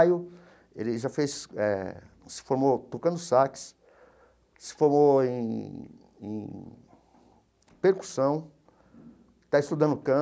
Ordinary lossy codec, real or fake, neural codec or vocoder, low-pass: none; real; none; none